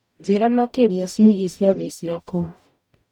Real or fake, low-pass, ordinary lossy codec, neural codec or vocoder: fake; 19.8 kHz; none; codec, 44.1 kHz, 0.9 kbps, DAC